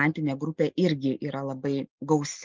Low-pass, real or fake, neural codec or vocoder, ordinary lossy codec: 7.2 kHz; real; none; Opus, 24 kbps